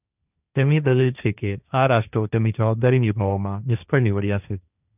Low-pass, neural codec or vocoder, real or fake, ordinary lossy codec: 3.6 kHz; codec, 16 kHz, 1.1 kbps, Voila-Tokenizer; fake; none